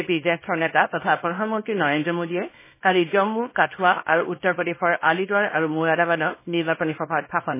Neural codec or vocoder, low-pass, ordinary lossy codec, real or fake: codec, 24 kHz, 0.9 kbps, WavTokenizer, small release; 3.6 kHz; MP3, 16 kbps; fake